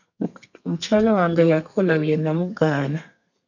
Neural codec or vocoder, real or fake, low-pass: codec, 32 kHz, 1.9 kbps, SNAC; fake; 7.2 kHz